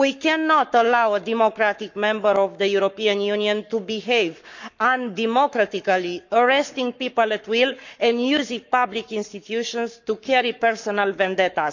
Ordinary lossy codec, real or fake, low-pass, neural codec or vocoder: none; fake; 7.2 kHz; codec, 44.1 kHz, 7.8 kbps, Pupu-Codec